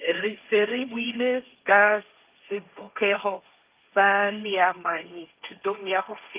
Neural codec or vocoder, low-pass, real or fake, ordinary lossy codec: codec, 16 kHz, 1.1 kbps, Voila-Tokenizer; 3.6 kHz; fake; Opus, 32 kbps